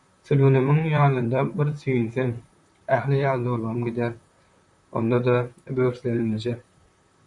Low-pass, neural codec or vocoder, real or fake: 10.8 kHz; vocoder, 44.1 kHz, 128 mel bands, Pupu-Vocoder; fake